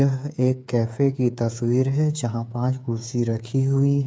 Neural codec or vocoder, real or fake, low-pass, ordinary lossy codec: codec, 16 kHz, 8 kbps, FreqCodec, smaller model; fake; none; none